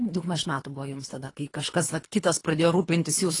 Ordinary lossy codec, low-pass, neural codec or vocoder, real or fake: AAC, 32 kbps; 10.8 kHz; codec, 24 kHz, 3 kbps, HILCodec; fake